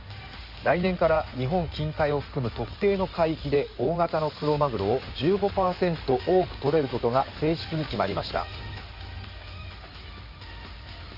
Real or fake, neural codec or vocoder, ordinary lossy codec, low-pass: fake; codec, 16 kHz in and 24 kHz out, 2.2 kbps, FireRedTTS-2 codec; MP3, 48 kbps; 5.4 kHz